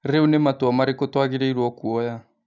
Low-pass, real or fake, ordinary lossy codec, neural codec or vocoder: 7.2 kHz; real; none; none